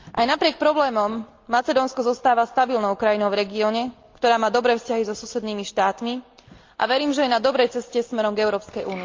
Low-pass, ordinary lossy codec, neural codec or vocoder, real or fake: 7.2 kHz; Opus, 24 kbps; none; real